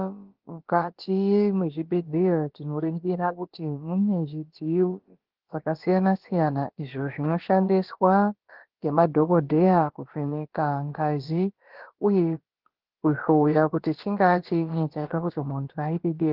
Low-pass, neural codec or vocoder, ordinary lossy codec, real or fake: 5.4 kHz; codec, 16 kHz, about 1 kbps, DyCAST, with the encoder's durations; Opus, 16 kbps; fake